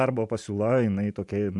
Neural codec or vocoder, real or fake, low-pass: vocoder, 44.1 kHz, 128 mel bands every 256 samples, BigVGAN v2; fake; 10.8 kHz